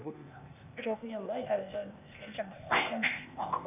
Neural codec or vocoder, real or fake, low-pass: codec, 16 kHz, 0.8 kbps, ZipCodec; fake; 3.6 kHz